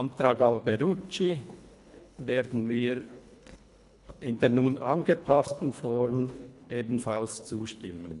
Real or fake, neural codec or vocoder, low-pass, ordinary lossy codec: fake; codec, 24 kHz, 1.5 kbps, HILCodec; 10.8 kHz; MP3, 96 kbps